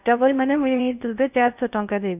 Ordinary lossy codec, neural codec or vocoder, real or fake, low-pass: none; codec, 16 kHz in and 24 kHz out, 0.6 kbps, FocalCodec, streaming, 4096 codes; fake; 3.6 kHz